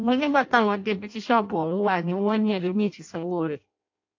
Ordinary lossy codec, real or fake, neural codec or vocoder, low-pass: AAC, 48 kbps; fake; codec, 16 kHz in and 24 kHz out, 0.6 kbps, FireRedTTS-2 codec; 7.2 kHz